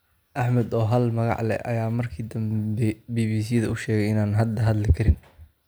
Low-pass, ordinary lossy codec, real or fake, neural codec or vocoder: none; none; real; none